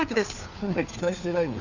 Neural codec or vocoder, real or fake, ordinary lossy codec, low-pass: codec, 16 kHz, 4 kbps, FunCodec, trained on LibriTTS, 50 frames a second; fake; none; 7.2 kHz